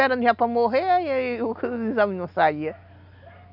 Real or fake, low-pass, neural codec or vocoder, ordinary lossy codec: real; 5.4 kHz; none; none